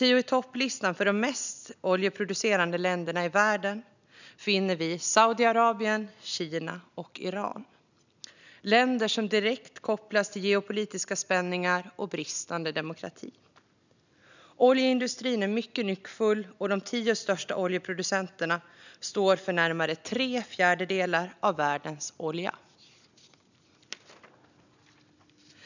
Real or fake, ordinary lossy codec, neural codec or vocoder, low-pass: real; none; none; 7.2 kHz